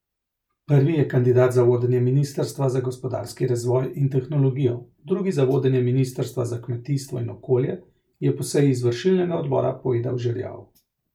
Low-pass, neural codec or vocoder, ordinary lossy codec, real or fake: 19.8 kHz; none; MP3, 96 kbps; real